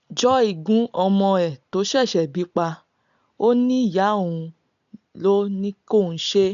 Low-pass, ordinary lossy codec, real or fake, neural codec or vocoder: 7.2 kHz; none; real; none